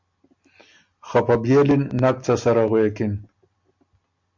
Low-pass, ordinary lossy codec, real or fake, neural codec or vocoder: 7.2 kHz; MP3, 64 kbps; real; none